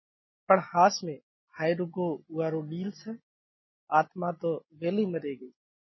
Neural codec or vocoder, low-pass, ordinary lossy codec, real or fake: none; 7.2 kHz; MP3, 24 kbps; real